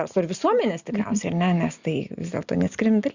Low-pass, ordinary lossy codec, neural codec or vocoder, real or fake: 7.2 kHz; Opus, 64 kbps; none; real